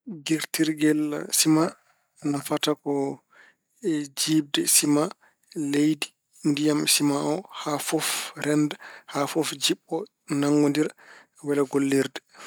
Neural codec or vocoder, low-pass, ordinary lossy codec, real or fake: none; none; none; real